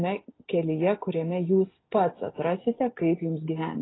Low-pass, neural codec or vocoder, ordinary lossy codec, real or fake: 7.2 kHz; none; AAC, 16 kbps; real